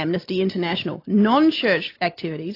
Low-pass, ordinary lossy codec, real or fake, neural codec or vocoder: 5.4 kHz; AAC, 32 kbps; real; none